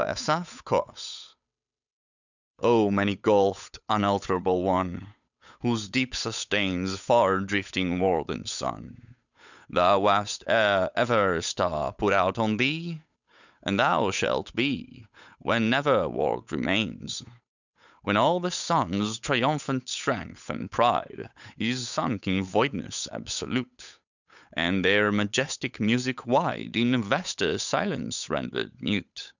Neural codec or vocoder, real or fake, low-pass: codec, 16 kHz, 8 kbps, FunCodec, trained on Chinese and English, 25 frames a second; fake; 7.2 kHz